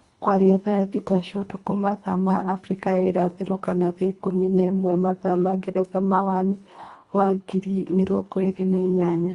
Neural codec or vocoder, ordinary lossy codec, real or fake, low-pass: codec, 24 kHz, 1.5 kbps, HILCodec; Opus, 64 kbps; fake; 10.8 kHz